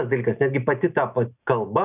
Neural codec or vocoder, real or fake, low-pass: none; real; 3.6 kHz